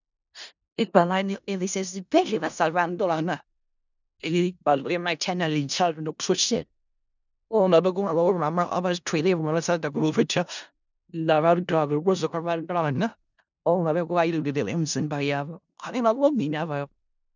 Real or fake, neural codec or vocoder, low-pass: fake; codec, 16 kHz in and 24 kHz out, 0.4 kbps, LongCat-Audio-Codec, four codebook decoder; 7.2 kHz